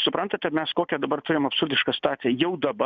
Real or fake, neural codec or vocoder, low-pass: real; none; 7.2 kHz